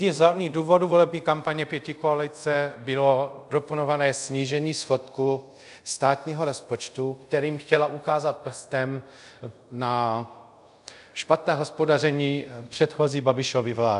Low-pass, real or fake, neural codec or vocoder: 10.8 kHz; fake; codec, 24 kHz, 0.5 kbps, DualCodec